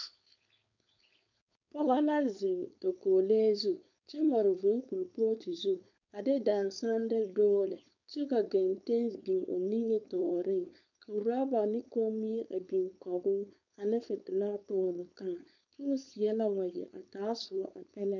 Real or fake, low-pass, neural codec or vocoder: fake; 7.2 kHz; codec, 16 kHz, 4.8 kbps, FACodec